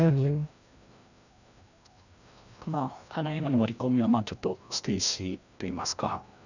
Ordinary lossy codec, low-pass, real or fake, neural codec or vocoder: none; 7.2 kHz; fake; codec, 16 kHz, 1 kbps, FreqCodec, larger model